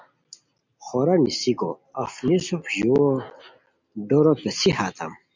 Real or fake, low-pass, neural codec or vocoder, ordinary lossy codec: real; 7.2 kHz; none; MP3, 64 kbps